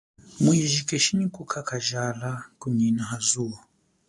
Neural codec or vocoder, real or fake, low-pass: none; real; 10.8 kHz